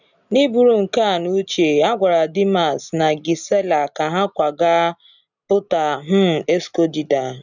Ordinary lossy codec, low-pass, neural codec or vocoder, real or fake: none; 7.2 kHz; none; real